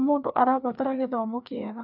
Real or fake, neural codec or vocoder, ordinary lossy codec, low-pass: fake; codec, 16 kHz, 2 kbps, FreqCodec, larger model; none; 5.4 kHz